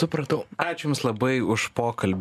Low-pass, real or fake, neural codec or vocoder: 14.4 kHz; real; none